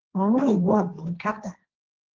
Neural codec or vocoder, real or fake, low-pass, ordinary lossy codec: codec, 16 kHz, 1.1 kbps, Voila-Tokenizer; fake; 7.2 kHz; Opus, 32 kbps